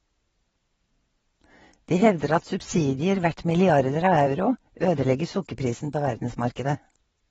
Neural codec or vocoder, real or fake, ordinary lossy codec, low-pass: vocoder, 22.05 kHz, 80 mel bands, WaveNeXt; fake; AAC, 24 kbps; 9.9 kHz